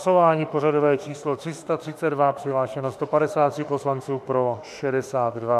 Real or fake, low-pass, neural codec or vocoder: fake; 14.4 kHz; autoencoder, 48 kHz, 32 numbers a frame, DAC-VAE, trained on Japanese speech